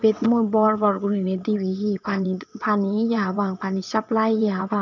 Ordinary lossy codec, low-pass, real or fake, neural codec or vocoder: none; 7.2 kHz; fake; vocoder, 22.05 kHz, 80 mel bands, WaveNeXt